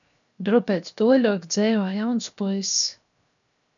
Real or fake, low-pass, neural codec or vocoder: fake; 7.2 kHz; codec, 16 kHz, 0.7 kbps, FocalCodec